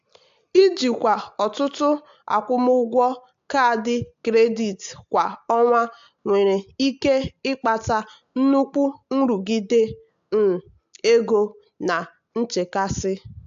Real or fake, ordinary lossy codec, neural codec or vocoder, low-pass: real; AAC, 64 kbps; none; 7.2 kHz